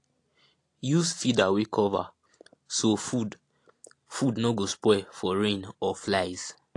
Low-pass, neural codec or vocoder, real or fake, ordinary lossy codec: 10.8 kHz; none; real; AAC, 48 kbps